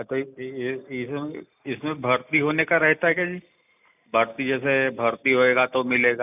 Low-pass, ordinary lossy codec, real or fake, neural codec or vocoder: 3.6 kHz; none; real; none